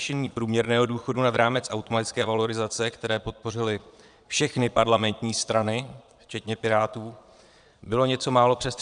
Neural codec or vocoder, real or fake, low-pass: vocoder, 22.05 kHz, 80 mel bands, Vocos; fake; 9.9 kHz